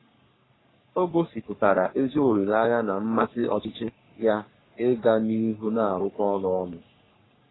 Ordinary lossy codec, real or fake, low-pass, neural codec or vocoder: AAC, 16 kbps; fake; 7.2 kHz; codec, 44.1 kHz, 3.4 kbps, Pupu-Codec